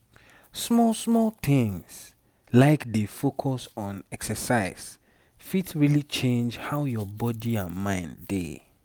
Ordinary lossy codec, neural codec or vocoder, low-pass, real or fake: none; none; none; real